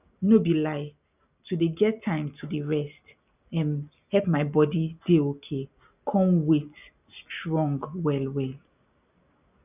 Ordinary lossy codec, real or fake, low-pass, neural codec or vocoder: none; real; 3.6 kHz; none